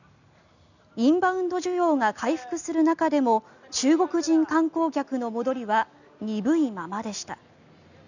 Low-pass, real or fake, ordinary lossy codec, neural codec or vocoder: 7.2 kHz; real; none; none